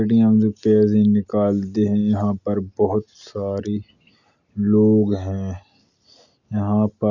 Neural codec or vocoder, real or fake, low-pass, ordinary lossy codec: none; real; 7.2 kHz; none